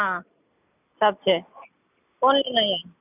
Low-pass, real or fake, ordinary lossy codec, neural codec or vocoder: 3.6 kHz; real; none; none